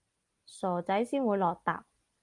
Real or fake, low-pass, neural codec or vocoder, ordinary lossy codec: real; 10.8 kHz; none; Opus, 32 kbps